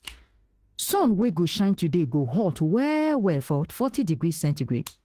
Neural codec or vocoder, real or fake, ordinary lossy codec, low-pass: autoencoder, 48 kHz, 32 numbers a frame, DAC-VAE, trained on Japanese speech; fake; Opus, 16 kbps; 14.4 kHz